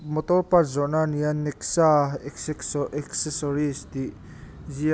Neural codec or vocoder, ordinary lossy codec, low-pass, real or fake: none; none; none; real